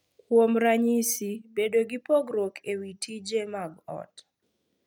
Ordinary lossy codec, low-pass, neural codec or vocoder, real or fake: none; 19.8 kHz; none; real